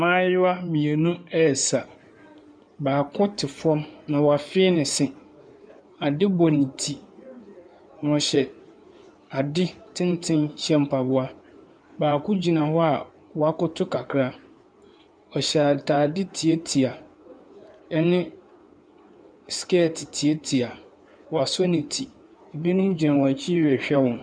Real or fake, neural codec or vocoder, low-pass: fake; codec, 16 kHz in and 24 kHz out, 2.2 kbps, FireRedTTS-2 codec; 9.9 kHz